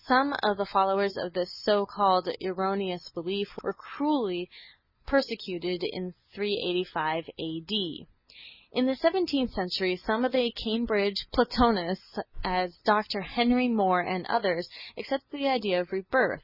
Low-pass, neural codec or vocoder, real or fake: 5.4 kHz; none; real